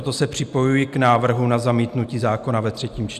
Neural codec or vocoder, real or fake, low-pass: none; real; 14.4 kHz